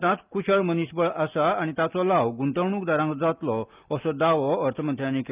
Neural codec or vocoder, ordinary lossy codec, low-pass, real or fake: none; Opus, 24 kbps; 3.6 kHz; real